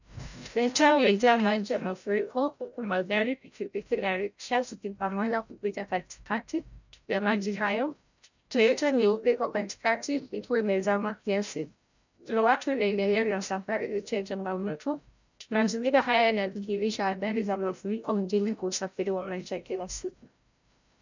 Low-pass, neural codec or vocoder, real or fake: 7.2 kHz; codec, 16 kHz, 0.5 kbps, FreqCodec, larger model; fake